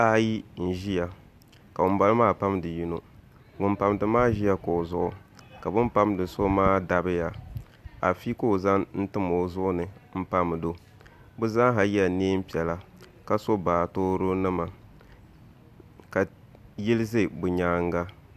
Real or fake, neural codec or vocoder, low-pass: real; none; 14.4 kHz